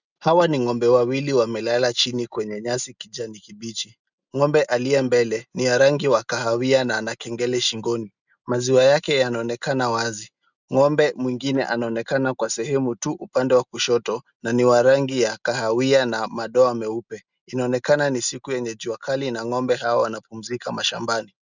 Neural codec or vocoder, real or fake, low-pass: none; real; 7.2 kHz